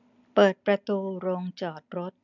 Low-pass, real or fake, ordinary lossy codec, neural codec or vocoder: 7.2 kHz; real; none; none